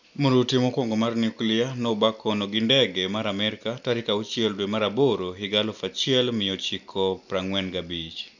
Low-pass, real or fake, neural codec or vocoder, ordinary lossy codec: 7.2 kHz; real; none; none